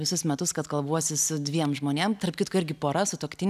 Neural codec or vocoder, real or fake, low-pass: none; real; 14.4 kHz